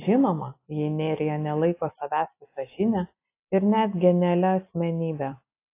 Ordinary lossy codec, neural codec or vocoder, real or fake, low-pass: AAC, 24 kbps; none; real; 3.6 kHz